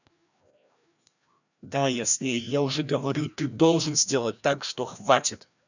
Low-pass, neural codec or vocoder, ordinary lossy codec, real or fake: 7.2 kHz; codec, 16 kHz, 1 kbps, FreqCodec, larger model; none; fake